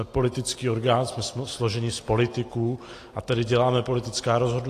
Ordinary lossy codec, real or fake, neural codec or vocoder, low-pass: AAC, 48 kbps; real; none; 14.4 kHz